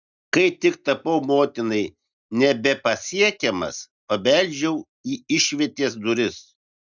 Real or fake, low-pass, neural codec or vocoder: real; 7.2 kHz; none